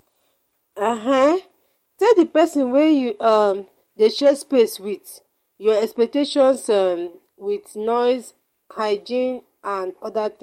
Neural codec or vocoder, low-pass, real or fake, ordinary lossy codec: codec, 44.1 kHz, 7.8 kbps, DAC; 19.8 kHz; fake; MP3, 64 kbps